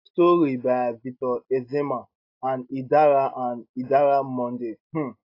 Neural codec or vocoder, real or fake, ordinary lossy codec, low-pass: none; real; AAC, 32 kbps; 5.4 kHz